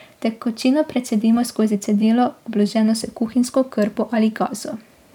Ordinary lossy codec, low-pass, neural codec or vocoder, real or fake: none; 19.8 kHz; vocoder, 44.1 kHz, 128 mel bands every 512 samples, BigVGAN v2; fake